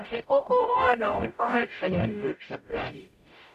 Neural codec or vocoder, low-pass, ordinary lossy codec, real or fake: codec, 44.1 kHz, 0.9 kbps, DAC; 14.4 kHz; none; fake